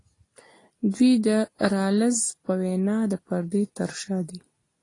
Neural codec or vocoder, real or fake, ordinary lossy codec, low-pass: none; real; AAC, 32 kbps; 10.8 kHz